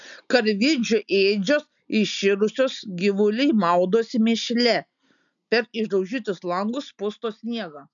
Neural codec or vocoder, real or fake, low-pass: none; real; 7.2 kHz